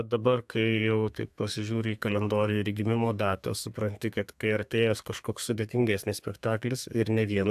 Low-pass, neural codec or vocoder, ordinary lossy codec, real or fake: 14.4 kHz; codec, 32 kHz, 1.9 kbps, SNAC; AAC, 96 kbps; fake